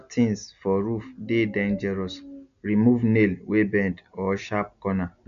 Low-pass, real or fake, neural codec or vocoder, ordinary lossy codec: 7.2 kHz; real; none; none